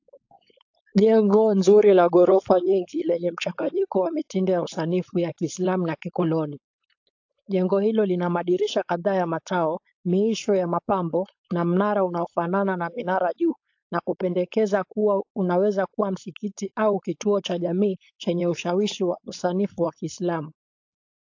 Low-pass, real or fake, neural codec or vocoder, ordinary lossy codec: 7.2 kHz; fake; codec, 16 kHz, 4.8 kbps, FACodec; AAC, 48 kbps